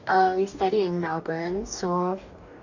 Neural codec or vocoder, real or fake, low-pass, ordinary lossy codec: codec, 44.1 kHz, 2.6 kbps, DAC; fake; 7.2 kHz; none